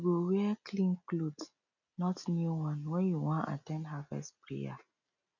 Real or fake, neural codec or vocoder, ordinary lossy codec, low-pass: real; none; none; 7.2 kHz